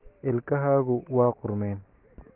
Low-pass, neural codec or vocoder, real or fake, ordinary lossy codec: 3.6 kHz; none; real; Opus, 16 kbps